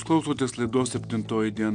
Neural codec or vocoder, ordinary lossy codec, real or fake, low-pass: none; MP3, 96 kbps; real; 9.9 kHz